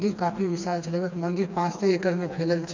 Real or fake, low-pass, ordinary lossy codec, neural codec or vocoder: fake; 7.2 kHz; MP3, 64 kbps; codec, 16 kHz, 2 kbps, FreqCodec, smaller model